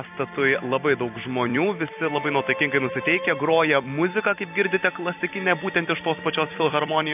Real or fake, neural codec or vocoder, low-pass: fake; vocoder, 44.1 kHz, 128 mel bands every 256 samples, BigVGAN v2; 3.6 kHz